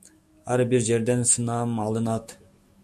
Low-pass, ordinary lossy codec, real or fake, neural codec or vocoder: 14.4 kHz; MP3, 64 kbps; fake; autoencoder, 48 kHz, 128 numbers a frame, DAC-VAE, trained on Japanese speech